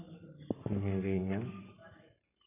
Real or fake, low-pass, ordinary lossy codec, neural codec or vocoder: fake; 3.6 kHz; none; codec, 44.1 kHz, 7.8 kbps, Pupu-Codec